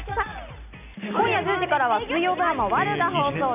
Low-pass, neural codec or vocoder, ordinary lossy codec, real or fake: 3.6 kHz; none; none; real